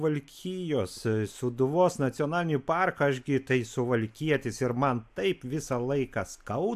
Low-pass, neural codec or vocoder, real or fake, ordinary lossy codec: 14.4 kHz; none; real; AAC, 96 kbps